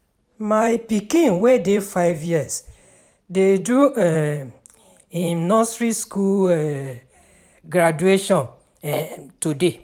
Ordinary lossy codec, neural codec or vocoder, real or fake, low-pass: none; vocoder, 44.1 kHz, 128 mel bands every 512 samples, BigVGAN v2; fake; 19.8 kHz